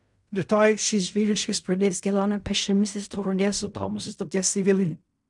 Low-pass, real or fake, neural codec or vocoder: 10.8 kHz; fake; codec, 16 kHz in and 24 kHz out, 0.4 kbps, LongCat-Audio-Codec, fine tuned four codebook decoder